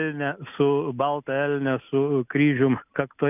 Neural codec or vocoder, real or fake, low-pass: none; real; 3.6 kHz